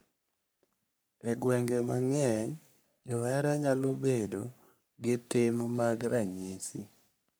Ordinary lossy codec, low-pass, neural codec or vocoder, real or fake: none; none; codec, 44.1 kHz, 3.4 kbps, Pupu-Codec; fake